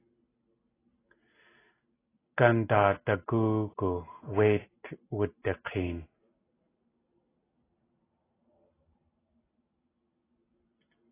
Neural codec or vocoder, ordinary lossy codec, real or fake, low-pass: none; AAC, 16 kbps; real; 3.6 kHz